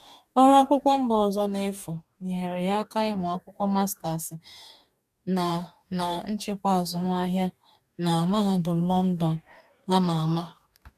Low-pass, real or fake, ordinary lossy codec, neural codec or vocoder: 14.4 kHz; fake; MP3, 96 kbps; codec, 44.1 kHz, 2.6 kbps, DAC